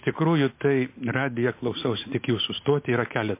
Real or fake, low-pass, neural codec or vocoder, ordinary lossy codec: real; 3.6 kHz; none; MP3, 24 kbps